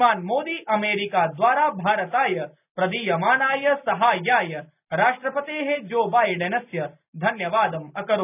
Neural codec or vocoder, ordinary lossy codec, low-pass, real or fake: none; none; 3.6 kHz; real